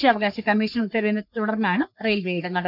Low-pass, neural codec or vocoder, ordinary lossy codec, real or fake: 5.4 kHz; codec, 44.1 kHz, 3.4 kbps, Pupu-Codec; none; fake